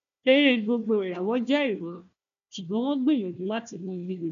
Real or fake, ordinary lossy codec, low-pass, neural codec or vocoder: fake; none; 7.2 kHz; codec, 16 kHz, 1 kbps, FunCodec, trained on Chinese and English, 50 frames a second